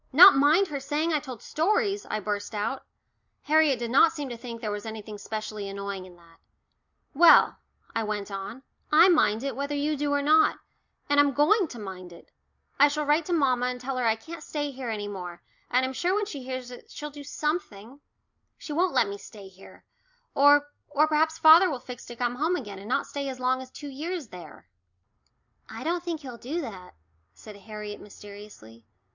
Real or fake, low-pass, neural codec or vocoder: real; 7.2 kHz; none